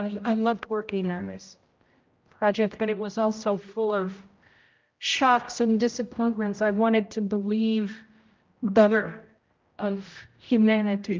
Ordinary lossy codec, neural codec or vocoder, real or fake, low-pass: Opus, 24 kbps; codec, 16 kHz, 0.5 kbps, X-Codec, HuBERT features, trained on general audio; fake; 7.2 kHz